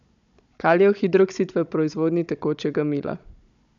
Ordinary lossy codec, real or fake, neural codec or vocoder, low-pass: none; fake; codec, 16 kHz, 16 kbps, FunCodec, trained on Chinese and English, 50 frames a second; 7.2 kHz